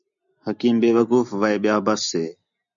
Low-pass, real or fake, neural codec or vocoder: 7.2 kHz; real; none